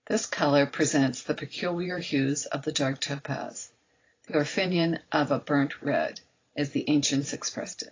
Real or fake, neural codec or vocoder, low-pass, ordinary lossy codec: fake; vocoder, 44.1 kHz, 128 mel bands every 512 samples, BigVGAN v2; 7.2 kHz; AAC, 32 kbps